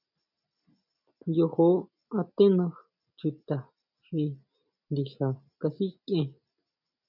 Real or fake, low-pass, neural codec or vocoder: real; 5.4 kHz; none